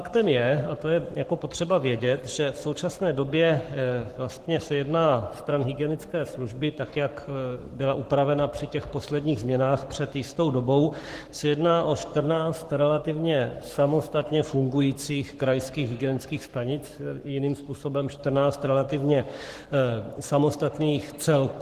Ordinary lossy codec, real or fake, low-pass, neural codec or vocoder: Opus, 16 kbps; fake; 14.4 kHz; codec, 44.1 kHz, 7.8 kbps, Pupu-Codec